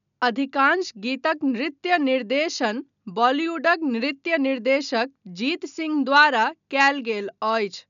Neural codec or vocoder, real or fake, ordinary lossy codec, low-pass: none; real; none; 7.2 kHz